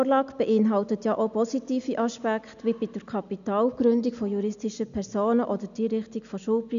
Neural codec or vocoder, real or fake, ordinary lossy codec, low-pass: none; real; none; 7.2 kHz